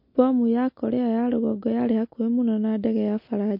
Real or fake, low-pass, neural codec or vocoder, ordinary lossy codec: real; 5.4 kHz; none; MP3, 32 kbps